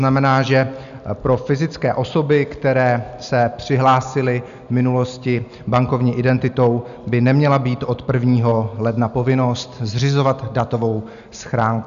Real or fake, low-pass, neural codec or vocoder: real; 7.2 kHz; none